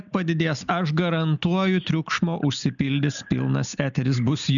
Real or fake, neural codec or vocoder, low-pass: real; none; 7.2 kHz